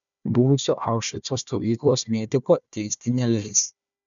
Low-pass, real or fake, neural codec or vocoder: 7.2 kHz; fake; codec, 16 kHz, 1 kbps, FunCodec, trained on Chinese and English, 50 frames a second